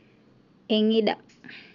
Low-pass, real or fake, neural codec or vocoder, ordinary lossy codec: 7.2 kHz; real; none; none